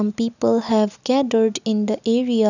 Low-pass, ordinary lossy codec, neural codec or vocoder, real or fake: 7.2 kHz; none; none; real